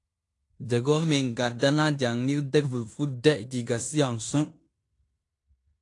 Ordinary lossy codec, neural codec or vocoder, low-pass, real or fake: AAC, 48 kbps; codec, 16 kHz in and 24 kHz out, 0.9 kbps, LongCat-Audio-Codec, fine tuned four codebook decoder; 10.8 kHz; fake